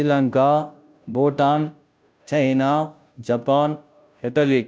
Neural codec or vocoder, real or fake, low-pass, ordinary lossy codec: codec, 16 kHz, 0.5 kbps, FunCodec, trained on Chinese and English, 25 frames a second; fake; none; none